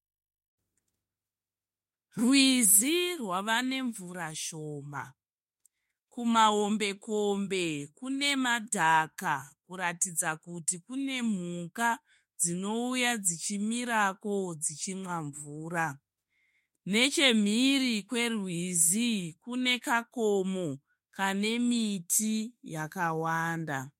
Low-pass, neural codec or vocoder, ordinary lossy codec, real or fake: 19.8 kHz; autoencoder, 48 kHz, 32 numbers a frame, DAC-VAE, trained on Japanese speech; MP3, 64 kbps; fake